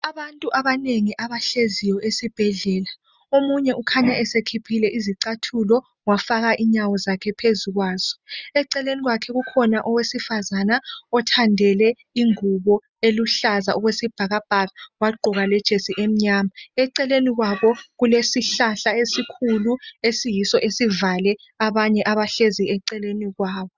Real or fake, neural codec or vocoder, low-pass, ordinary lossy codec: real; none; 7.2 kHz; Opus, 64 kbps